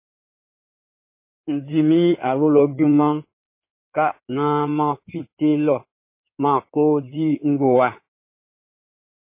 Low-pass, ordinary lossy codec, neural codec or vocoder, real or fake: 3.6 kHz; MP3, 24 kbps; codec, 16 kHz in and 24 kHz out, 2.2 kbps, FireRedTTS-2 codec; fake